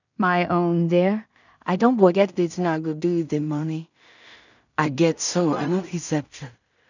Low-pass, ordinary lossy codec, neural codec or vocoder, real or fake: 7.2 kHz; none; codec, 16 kHz in and 24 kHz out, 0.4 kbps, LongCat-Audio-Codec, two codebook decoder; fake